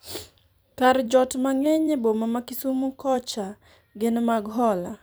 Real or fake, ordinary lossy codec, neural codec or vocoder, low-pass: fake; none; vocoder, 44.1 kHz, 128 mel bands every 256 samples, BigVGAN v2; none